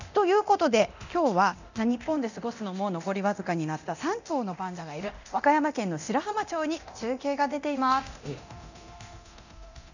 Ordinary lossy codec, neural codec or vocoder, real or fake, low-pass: none; codec, 24 kHz, 0.9 kbps, DualCodec; fake; 7.2 kHz